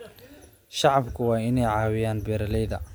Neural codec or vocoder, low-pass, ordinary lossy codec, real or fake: none; none; none; real